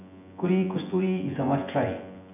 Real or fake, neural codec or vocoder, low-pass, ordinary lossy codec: fake; vocoder, 24 kHz, 100 mel bands, Vocos; 3.6 kHz; none